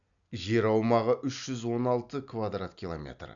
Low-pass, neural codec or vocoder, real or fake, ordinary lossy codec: 7.2 kHz; none; real; none